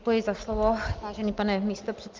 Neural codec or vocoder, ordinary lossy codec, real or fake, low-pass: none; Opus, 32 kbps; real; 7.2 kHz